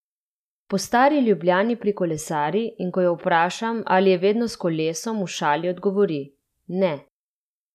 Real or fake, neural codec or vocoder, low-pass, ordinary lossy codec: real; none; 14.4 kHz; none